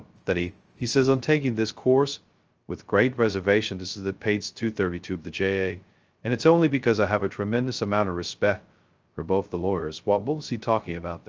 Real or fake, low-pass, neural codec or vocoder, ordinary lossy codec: fake; 7.2 kHz; codec, 16 kHz, 0.2 kbps, FocalCodec; Opus, 24 kbps